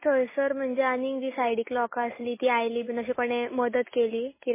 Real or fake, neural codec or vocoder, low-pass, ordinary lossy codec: real; none; 3.6 kHz; MP3, 16 kbps